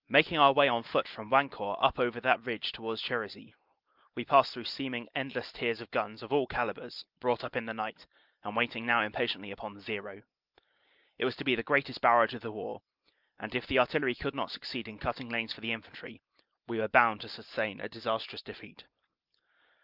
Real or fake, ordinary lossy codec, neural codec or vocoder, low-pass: real; Opus, 24 kbps; none; 5.4 kHz